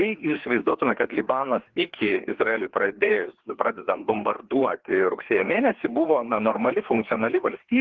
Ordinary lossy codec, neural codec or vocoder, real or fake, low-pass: Opus, 16 kbps; codec, 16 kHz, 2 kbps, FreqCodec, larger model; fake; 7.2 kHz